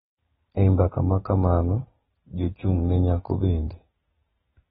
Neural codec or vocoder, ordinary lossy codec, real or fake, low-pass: none; AAC, 16 kbps; real; 19.8 kHz